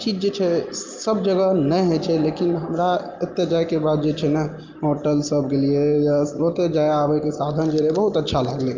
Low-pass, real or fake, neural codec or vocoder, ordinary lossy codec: 7.2 kHz; real; none; Opus, 24 kbps